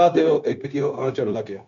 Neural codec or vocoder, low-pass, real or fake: codec, 16 kHz, 0.9 kbps, LongCat-Audio-Codec; 7.2 kHz; fake